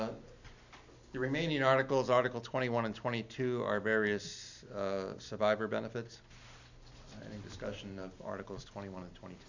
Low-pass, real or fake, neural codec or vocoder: 7.2 kHz; real; none